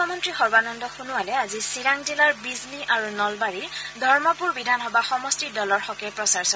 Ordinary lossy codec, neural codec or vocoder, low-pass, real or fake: none; none; none; real